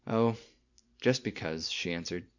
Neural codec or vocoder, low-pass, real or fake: vocoder, 44.1 kHz, 128 mel bands every 256 samples, BigVGAN v2; 7.2 kHz; fake